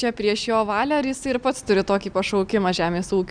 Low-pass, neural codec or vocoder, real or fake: 9.9 kHz; none; real